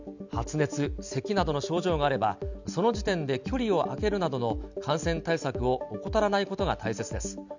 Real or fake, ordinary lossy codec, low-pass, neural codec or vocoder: real; none; 7.2 kHz; none